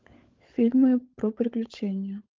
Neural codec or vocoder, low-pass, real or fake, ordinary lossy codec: codec, 16 kHz, 16 kbps, FunCodec, trained on LibriTTS, 50 frames a second; 7.2 kHz; fake; Opus, 24 kbps